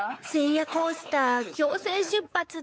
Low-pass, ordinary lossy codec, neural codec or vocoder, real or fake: none; none; codec, 16 kHz, 4 kbps, X-Codec, WavLM features, trained on Multilingual LibriSpeech; fake